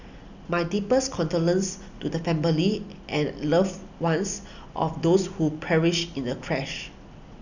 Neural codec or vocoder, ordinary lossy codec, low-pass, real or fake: none; none; 7.2 kHz; real